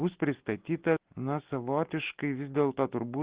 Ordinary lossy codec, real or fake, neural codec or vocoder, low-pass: Opus, 16 kbps; real; none; 3.6 kHz